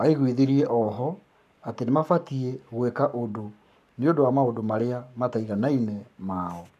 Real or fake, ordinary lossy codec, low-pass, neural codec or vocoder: fake; none; 14.4 kHz; codec, 44.1 kHz, 7.8 kbps, Pupu-Codec